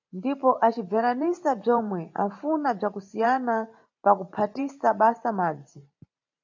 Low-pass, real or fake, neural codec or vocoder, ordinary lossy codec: 7.2 kHz; fake; vocoder, 44.1 kHz, 128 mel bands every 512 samples, BigVGAN v2; AAC, 48 kbps